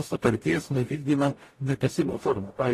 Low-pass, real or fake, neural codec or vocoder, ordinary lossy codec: 14.4 kHz; fake; codec, 44.1 kHz, 0.9 kbps, DAC; AAC, 48 kbps